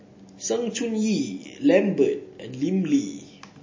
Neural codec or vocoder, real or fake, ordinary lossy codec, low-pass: none; real; MP3, 32 kbps; 7.2 kHz